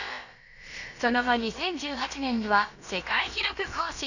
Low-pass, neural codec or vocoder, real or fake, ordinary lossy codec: 7.2 kHz; codec, 16 kHz, about 1 kbps, DyCAST, with the encoder's durations; fake; none